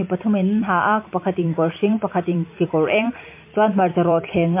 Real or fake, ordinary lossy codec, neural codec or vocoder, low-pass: fake; MP3, 24 kbps; vocoder, 44.1 kHz, 128 mel bands every 256 samples, BigVGAN v2; 3.6 kHz